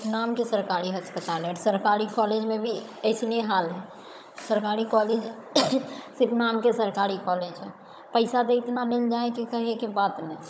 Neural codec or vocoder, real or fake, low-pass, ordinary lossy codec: codec, 16 kHz, 16 kbps, FunCodec, trained on Chinese and English, 50 frames a second; fake; none; none